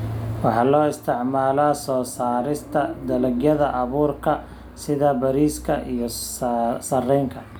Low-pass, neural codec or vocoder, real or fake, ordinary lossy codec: none; none; real; none